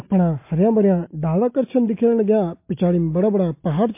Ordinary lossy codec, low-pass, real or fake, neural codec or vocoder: MP3, 24 kbps; 3.6 kHz; real; none